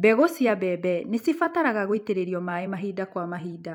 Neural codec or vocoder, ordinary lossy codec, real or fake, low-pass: vocoder, 44.1 kHz, 128 mel bands every 256 samples, BigVGAN v2; MP3, 96 kbps; fake; 19.8 kHz